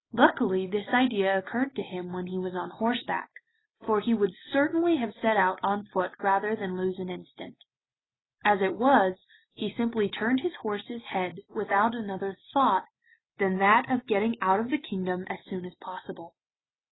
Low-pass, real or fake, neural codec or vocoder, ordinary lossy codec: 7.2 kHz; real; none; AAC, 16 kbps